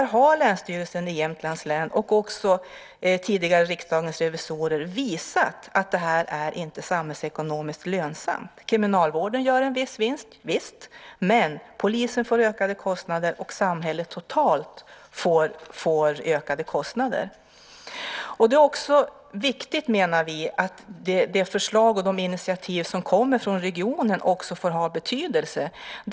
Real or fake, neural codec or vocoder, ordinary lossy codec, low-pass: real; none; none; none